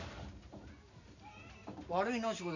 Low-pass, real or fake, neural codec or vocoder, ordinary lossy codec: 7.2 kHz; real; none; none